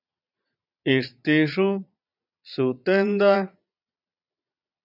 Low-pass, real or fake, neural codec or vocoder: 5.4 kHz; fake; vocoder, 22.05 kHz, 80 mel bands, Vocos